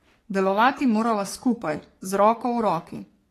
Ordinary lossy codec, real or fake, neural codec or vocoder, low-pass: AAC, 48 kbps; fake; codec, 44.1 kHz, 3.4 kbps, Pupu-Codec; 14.4 kHz